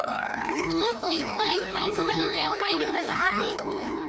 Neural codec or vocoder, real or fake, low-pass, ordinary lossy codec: codec, 16 kHz, 1 kbps, FreqCodec, larger model; fake; none; none